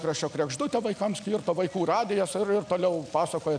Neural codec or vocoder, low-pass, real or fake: none; 9.9 kHz; real